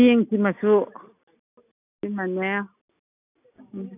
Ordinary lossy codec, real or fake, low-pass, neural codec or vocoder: none; real; 3.6 kHz; none